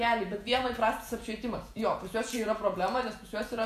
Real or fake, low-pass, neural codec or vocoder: fake; 14.4 kHz; vocoder, 48 kHz, 128 mel bands, Vocos